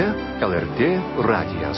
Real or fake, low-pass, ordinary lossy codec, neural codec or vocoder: real; 7.2 kHz; MP3, 24 kbps; none